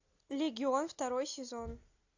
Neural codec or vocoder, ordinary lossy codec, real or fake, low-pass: none; AAC, 48 kbps; real; 7.2 kHz